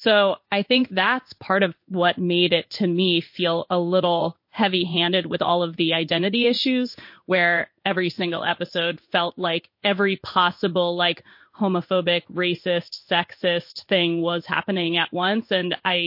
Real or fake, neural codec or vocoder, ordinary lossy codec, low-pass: fake; codec, 16 kHz in and 24 kHz out, 1 kbps, XY-Tokenizer; MP3, 32 kbps; 5.4 kHz